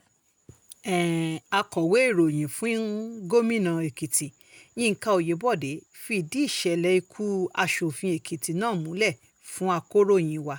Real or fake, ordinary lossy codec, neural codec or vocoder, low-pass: real; none; none; none